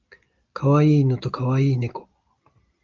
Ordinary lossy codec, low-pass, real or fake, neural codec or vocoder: Opus, 24 kbps; 7.2 kHz; real; none